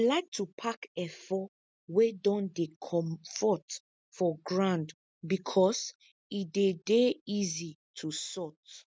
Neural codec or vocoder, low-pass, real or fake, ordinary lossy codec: none; none; real; none